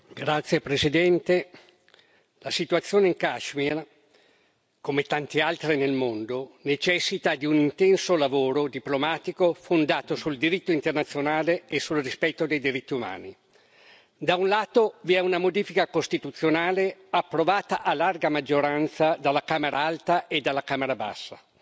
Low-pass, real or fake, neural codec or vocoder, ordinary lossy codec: none; real; none; none